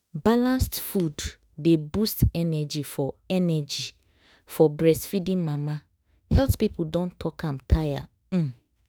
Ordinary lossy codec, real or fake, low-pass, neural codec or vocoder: none; fake; none; autoencoder, 48 kHz, 32 numbers a frame, DAC-VAE, trained on Japanese speech